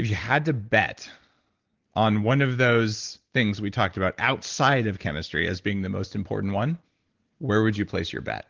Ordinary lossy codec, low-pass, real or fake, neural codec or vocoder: Opus, 16 kbps; 7.2 kHz; real; none